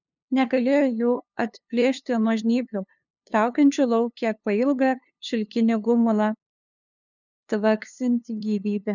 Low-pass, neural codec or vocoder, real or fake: 7.2 kHz; codec, 16 kHz, 2 kbps, FunCodec, trained on LibriTTS, 25 frames a second; fake